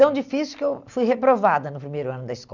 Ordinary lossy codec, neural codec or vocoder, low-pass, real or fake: none; none; 7.2 kHz; real